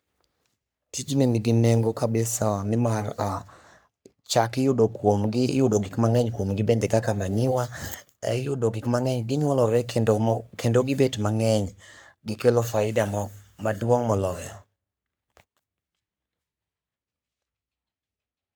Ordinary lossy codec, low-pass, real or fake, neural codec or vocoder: none; none; fake; codec, 44.1 kHz, 3.4 kbps, Pupu-Codec